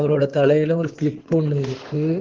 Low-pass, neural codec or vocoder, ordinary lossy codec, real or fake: 7.2 kHz; codec, 16 kHz in and 24 kHz out, 2.2 kbps, FireRedTTS-2 codec; Opus, 16 kbps; fake